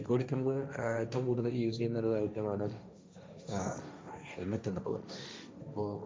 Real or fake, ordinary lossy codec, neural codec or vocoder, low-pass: fake; none; codec, 16 kHz, 1.1 kbps, Voila-Tokenizer; none